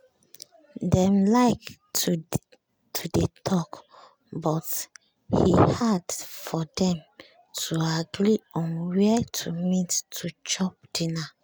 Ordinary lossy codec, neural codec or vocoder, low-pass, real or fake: none; none; none; real